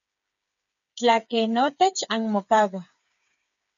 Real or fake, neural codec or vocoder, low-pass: fake; codec, 16 kHz, 8 kbps, FreqCodec, smaller model; 7.2 kHz